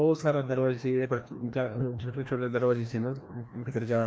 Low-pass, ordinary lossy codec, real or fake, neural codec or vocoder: none; none; fake; codec, 16 kHz, 1 kbps, FreqCodec, larger model